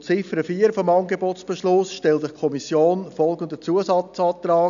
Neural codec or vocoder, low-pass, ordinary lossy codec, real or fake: none; 7.2 kHz; none; real